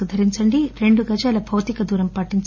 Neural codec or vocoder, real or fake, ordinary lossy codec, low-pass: none; real; none; 7.2 kHz